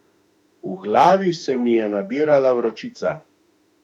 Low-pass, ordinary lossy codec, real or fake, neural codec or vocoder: 19.8 kHz; none; fake; autoencoder, 48 kHz, 32 numbers a frame, DAC-VAE, trained on Japanese speech